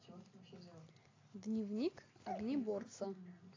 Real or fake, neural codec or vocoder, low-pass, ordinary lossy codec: real; none; 7.2 kHz; AAC, 32 kbps